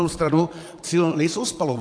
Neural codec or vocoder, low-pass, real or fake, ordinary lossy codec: vocoder, 22.05 kHz, 80 mel bands, Vocos; 9.9 kHz; fake; AAC, 64 kbps